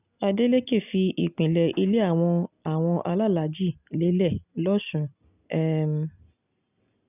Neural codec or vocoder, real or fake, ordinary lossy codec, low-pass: none; real; none; 3.6 kHz